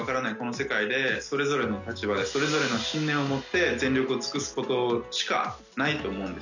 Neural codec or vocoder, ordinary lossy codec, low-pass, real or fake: none; none; 7.2 kHz; real